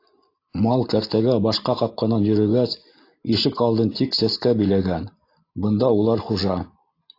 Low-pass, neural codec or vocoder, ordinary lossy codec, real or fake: 5.4 kHz; none; AAC, 32 kbps; real